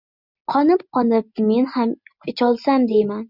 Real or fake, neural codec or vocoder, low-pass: real; none; 5.4 kHz